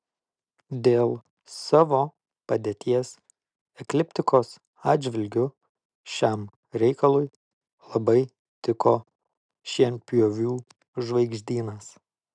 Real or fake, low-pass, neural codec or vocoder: real; 9.9 kHz; none